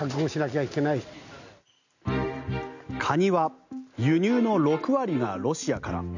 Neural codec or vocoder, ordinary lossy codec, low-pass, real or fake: none; none; 7.2 kHz; real